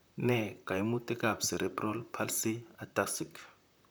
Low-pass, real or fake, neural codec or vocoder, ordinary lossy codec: none; fake; vocoder, 44.1 kHz, 128 mel bands, Pupu-Vocoder; none